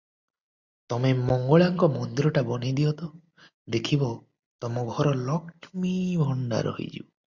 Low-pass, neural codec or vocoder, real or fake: 7.2 kHz; none; real